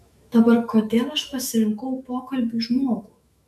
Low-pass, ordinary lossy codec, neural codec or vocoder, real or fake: 14.4 kHz; AAC, 96 kbps; autoencoder, 48 kHz, 128 numbers a frame, DAC-VAE, trained on Japanese speech; fake